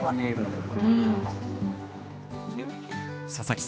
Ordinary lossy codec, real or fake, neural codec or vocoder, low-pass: none; fake; codec, 16 kHz, 2 kbps, X-Codec, HuBERT features, trained on general audio; none